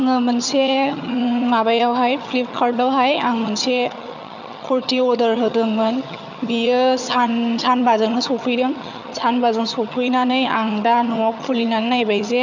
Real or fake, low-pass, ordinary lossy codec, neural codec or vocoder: fake; 7.2 kHz; none; vocoder, 22.05 kHz, 80 mel bands, HiFi-GAN